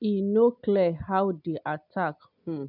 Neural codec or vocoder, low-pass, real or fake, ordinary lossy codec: none; 5.4 kHz; real; none